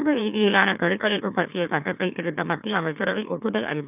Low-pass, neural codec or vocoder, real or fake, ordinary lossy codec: 3.6 kHz; autoencoder, 44.1 kHz, a latent of 192 numbers a frame, MeloTTS; fake; none